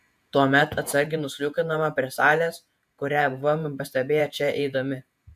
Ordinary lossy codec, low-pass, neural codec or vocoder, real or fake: AAC, 96 kbps; 14.4 kHz; vocoder, 48 kHz, 128 mel bands, Vocos; fake